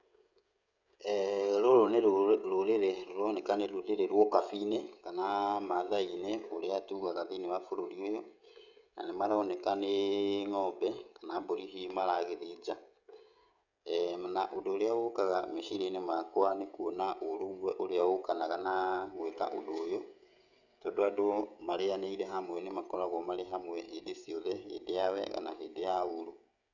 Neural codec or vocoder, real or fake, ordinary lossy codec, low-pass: codec, 16 kHz, 16 kbps, FreqCodec, smaller model; fake; none; 7.2 kHz